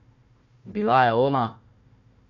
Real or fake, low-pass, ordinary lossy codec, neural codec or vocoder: fake; 7.2 kHz; none; codec, 16 kHz, 1 kbps, FunCodec, trained on Chinese and English, 50 frames a second